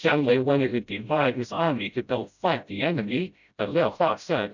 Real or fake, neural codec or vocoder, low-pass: fake; codec, 16 kHz, 0.5 kbps, FreqCodec, smaller model; 7.2 kHz